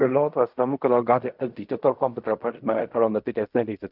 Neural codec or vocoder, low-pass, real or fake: codec, 16 kHz in and 24 kHz out, 0.4 kbps, LongCat-Audio-Codec, fine tuned four codebook decoder; 5.4 kHz; fake